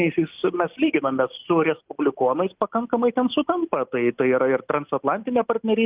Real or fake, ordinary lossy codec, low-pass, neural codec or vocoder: real; Opus, 32 kbps; 3.6 kHz; none